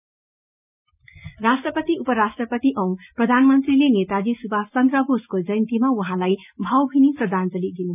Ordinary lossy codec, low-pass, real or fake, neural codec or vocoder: none; 3.6 kHz; real; none